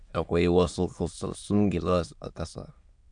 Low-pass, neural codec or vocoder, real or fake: 9.9 kHz; autoencoder, 22.05 kHz, a latent of 192 numbers a frame, VITS, trained on many speakers; fake